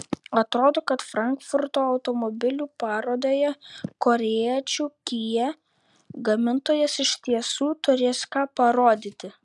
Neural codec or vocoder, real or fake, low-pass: none; real; 10.8 kHz